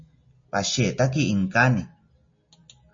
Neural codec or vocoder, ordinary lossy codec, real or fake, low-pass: none; MP3, 32 kbps; real; 7.2 kHz